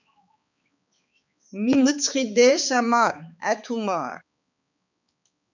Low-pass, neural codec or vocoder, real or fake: 7.2 kHz; codec, 16 kHz, 4 kbps, X-Codec, HuBERT features, trained on balanced general audio; fake